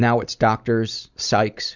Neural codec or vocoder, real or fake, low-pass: vocoder, 44.1 kHz, 128 mel bands every 256 samples, BigVGAN v2; fake; 7.2 kHz